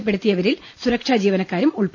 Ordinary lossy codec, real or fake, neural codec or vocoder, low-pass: none; real; none; none